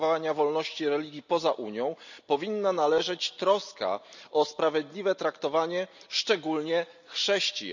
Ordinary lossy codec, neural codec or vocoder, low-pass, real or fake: none; none; 7.2 kHz; real